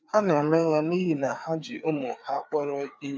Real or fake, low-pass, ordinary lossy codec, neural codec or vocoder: fake; none; none; codec, 16 kHz, 4 kbps, FreqCodec, larger model